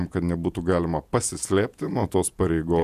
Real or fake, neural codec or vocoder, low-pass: fake; vocoder, 48 kHz, 128 mel bands, Vocos; 14.4 kHz